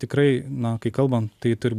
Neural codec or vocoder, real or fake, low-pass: none; real; 14.4 kHz